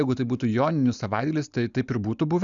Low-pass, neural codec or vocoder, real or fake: 7.2 kHz; none; real